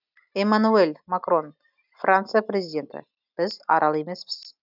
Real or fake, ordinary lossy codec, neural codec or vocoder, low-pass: real; none; none; 5.4 kHz